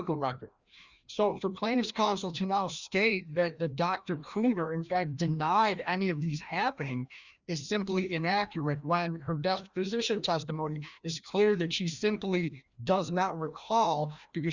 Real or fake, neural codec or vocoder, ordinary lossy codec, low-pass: fake; codec, 16 kHz, 1 kbps, FreqCodec, larger model; Opus, 64 kbps; 7.2 kHz